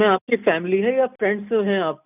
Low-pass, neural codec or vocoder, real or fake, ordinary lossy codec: 3.6 kHz; none; real; none